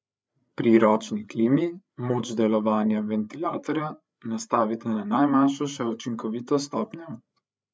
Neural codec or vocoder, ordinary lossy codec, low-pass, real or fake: codec, 16 kHz, 8 kbps, FreqCodec, larger model; none; none; fake